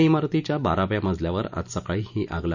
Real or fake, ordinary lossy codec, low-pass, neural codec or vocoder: real; none; 7.2 kHz; none